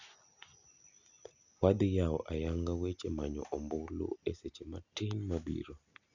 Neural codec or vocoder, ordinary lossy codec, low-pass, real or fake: none; none; 7.2 kHz; real